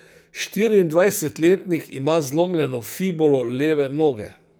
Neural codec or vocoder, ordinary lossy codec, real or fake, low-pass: codec, 44.1 kHz, 2.6 kbps, SNAC; none; fake; none